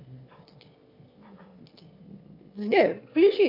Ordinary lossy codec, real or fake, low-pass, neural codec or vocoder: MP3, 32 kbps; fake; 5.4 kHz; autoencoder, 22.05 kHz, a latent of 192 numbers a frame, VITS, trained on one speaker